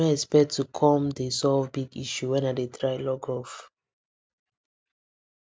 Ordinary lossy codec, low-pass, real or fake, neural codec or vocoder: none; none; real; none